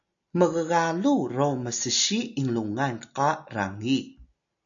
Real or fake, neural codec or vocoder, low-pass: real; none; 7.2 kHz